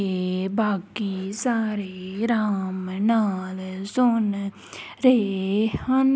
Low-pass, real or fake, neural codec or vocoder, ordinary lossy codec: none; real; none; none